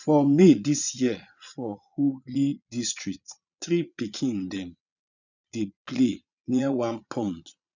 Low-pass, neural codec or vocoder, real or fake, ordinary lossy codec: 7.2 kHz; vocoder, 22.05 kHz, 80 mel bands, Vocos; fake; none